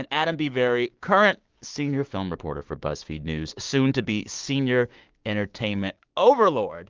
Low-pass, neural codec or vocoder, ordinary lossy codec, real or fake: 7.2 kHz; codec, 16 kHz, 6 kbps, DAC; Opus, 32 kbps; fake